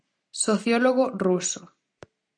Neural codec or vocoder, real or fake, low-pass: none; real; 9.9 kHz